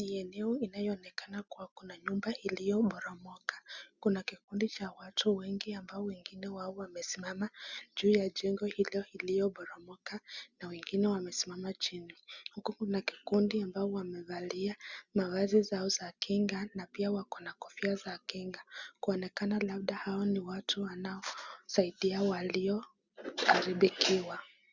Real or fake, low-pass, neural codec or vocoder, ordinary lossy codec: real; 7.2 kHz; none; Opus, 64 kbps